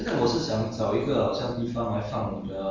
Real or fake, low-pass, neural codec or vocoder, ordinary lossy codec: real; 7.2 kHz; none; Opus, 32 kbps